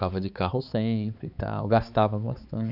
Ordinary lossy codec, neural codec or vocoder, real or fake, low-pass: none; codec, 16 kHz, 4 kbps, X-Codec, HuBERT features, trained on balanced general audio; fake; 5.4 kHz